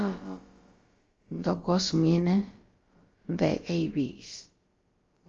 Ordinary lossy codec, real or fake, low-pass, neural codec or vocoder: Opus, 32 kbps; fake; 7.2 kHz; codec, 16 kHz, about 1 kbps, DyCAST, with the encoder's durations